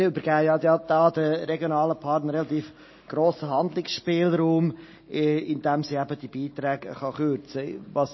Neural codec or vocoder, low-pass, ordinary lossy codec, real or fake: none; 7.2 kHz; MP3, 24 kbps; real